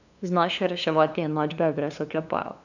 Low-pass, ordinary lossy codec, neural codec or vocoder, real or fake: 7.2 kHz; none; codec, 16 kHz, 2 kbps, FunCodec, trained on LibriTTS, 25 frames a second; fake